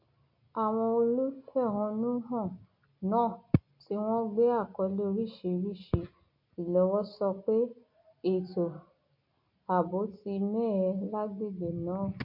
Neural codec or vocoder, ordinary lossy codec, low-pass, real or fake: none; MP3, 32 kbps; 5.4 kHz; real